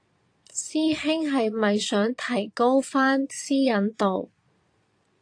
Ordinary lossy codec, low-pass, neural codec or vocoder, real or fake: AAC, 48 kbps; 9.9 kHz; vocoder, 22.05 kHz, 80 mel bands, Vocos; fake